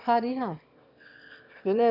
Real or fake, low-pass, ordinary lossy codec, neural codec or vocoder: fake; 5.4 kHz; none; autoencoder, 22.05 kHz, a latent of 192 numbers a frame, VITS, trained on one speaker